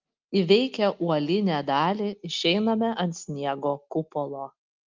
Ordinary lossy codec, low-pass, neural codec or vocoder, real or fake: Opus, 24 kbps; 7.2 kHz; none; real